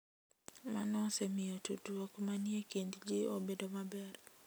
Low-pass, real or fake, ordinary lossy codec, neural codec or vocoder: none; real; none; none